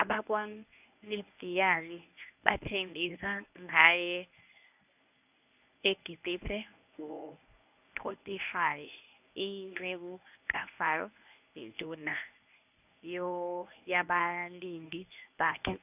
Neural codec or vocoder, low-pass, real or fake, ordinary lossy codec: codec, 24 kHz, 0.9 kbps, WavTokenizer, medium speech release version 1; 3.6 kHz; fake; none